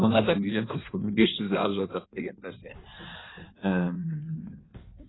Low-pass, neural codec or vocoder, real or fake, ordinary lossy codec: 7.2 kHz; codec, 16 kHz in and 24 kHz out, 1.1 kbps, FireRedTTS-2 codec; fake; AAC, 16 kbps